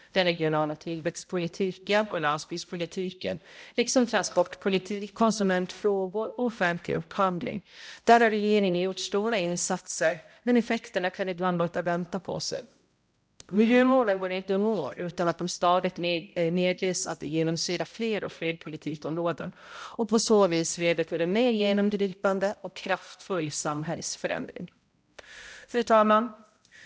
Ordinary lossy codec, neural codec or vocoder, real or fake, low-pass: none; codec, 16 kHz, 0.5 kbps, X-Codec, HuBERT features, trained on balanced general audio; fake; none